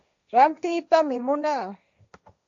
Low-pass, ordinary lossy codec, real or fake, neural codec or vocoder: 7.2 kHz; AAC, 64 kbps; fake; codec, 16 kHz, 1.1 kbps, Voila-Tokenizer